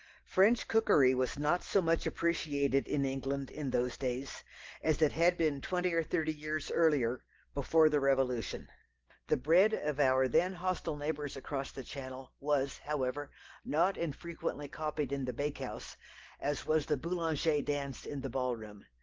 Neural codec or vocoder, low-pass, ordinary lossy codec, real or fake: none; 7.2 kHz; Opus, 16 kbps; real